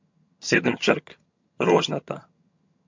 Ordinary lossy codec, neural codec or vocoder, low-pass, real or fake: MP3, 48 kbps; vocoder, 22.05 kHz, 80 mel bands, HiFi-GAN; 7.2 kHz; fake